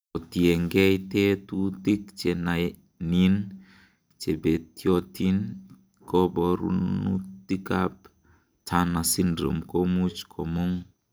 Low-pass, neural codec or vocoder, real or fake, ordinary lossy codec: none; none; real; none